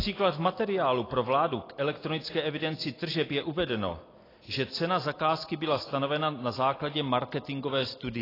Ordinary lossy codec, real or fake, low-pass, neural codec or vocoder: AAC, 24 kbps; real; 5.4 kHz; none